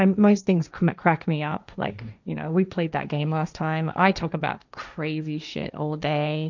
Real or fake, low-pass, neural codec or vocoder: fake; 7.2 kHz; codec, 16 kHz, 1.1 kbps, Voila-Tokenizer